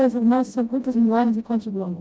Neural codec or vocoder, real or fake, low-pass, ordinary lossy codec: codec, 16 kHz, 0.5 kbps, FreqCodec, smaller model; fake; none; none